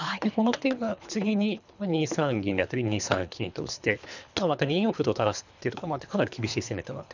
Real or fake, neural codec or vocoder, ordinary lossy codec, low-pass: fake; codec, 24 kHz, 3 kbps, HILCodec; none; 7.2 kHz